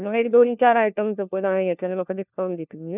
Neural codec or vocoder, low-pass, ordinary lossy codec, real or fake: codec, 16 kHz, 1 kbps, FunCodec, trained on LibriTTS, 50 frames a second; 3.6 kHz; none; fake